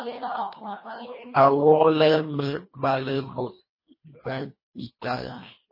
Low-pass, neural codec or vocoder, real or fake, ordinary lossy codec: 5.4 kHz; codec, 24 kHz, 1.5 kbps, HILCodec; fake; MP3, 24 kbps